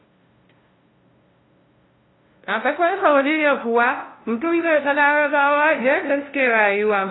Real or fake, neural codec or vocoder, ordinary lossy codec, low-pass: fake; codec, 16 kHz, 0.5 kbps, FunCodec, trained on LibriTTS, 25 frames a second; AAC, 16 kbps; 7.2 kHz